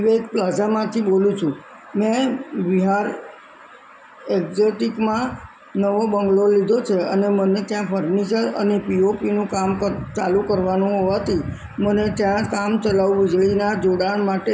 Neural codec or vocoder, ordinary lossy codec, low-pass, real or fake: none; none; none; real